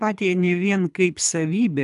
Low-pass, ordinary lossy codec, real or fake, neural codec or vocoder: 10.8 kHz; AAC, 96 kbps; fake; codec, 24 kHz, 3 kbps, HILCodec